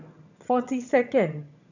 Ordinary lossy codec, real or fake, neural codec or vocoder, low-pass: none; fake; vocoder, 22.05 kHz, 80 mel bands, HiFi-GAN; 7.2 kHz